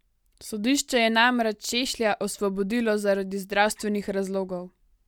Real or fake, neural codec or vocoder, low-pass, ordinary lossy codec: real; none; 19.8 kHz; none